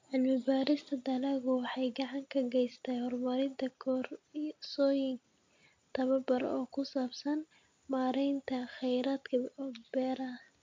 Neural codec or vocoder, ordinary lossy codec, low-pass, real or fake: none; MP3, 64 kbps; 7.2 kHz; real